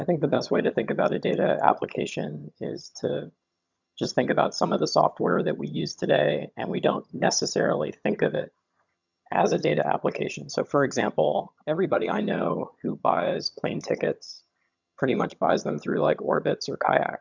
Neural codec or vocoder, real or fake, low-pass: vocoder, 22.05 kHz, 80 mel bands, HiFi-GAN; fake; 7.2 kHz